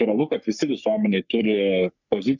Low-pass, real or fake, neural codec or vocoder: 7.2 kHz; fake; codec, 44.1 kHz, 3.4 kbps, Pupu-Codec